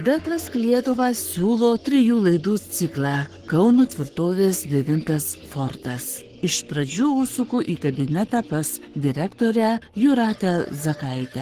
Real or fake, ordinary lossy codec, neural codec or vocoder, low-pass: fake; Opus, 16 kbps; codec, 44.1 kHz, 3.4 kbps, Pupu-Codec; 14.4 kHz